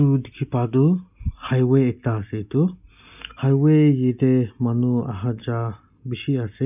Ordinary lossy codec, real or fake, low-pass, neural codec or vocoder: none; real; 3.6 kHz; none